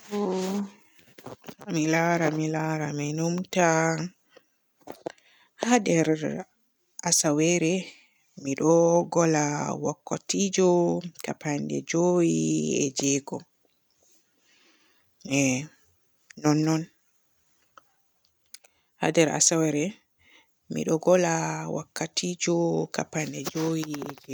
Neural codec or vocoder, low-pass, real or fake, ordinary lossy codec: none; none; real; none